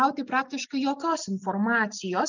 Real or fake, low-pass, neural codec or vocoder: real; 7.2 kHz; none